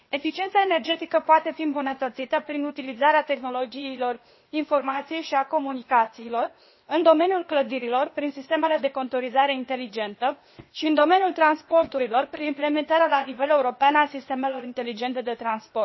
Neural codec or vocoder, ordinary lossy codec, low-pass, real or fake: codec, 16 kHz, 0.8 kbps, ZipCodec; MP3, 24 kbps; 7.2 kHz; fake